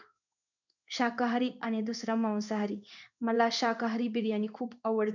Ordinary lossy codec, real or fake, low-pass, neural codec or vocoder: none; fake; 7.2 kHz; codec, 16 kHz in and 24 kHz out, 1 kbps, XY-Tokenizer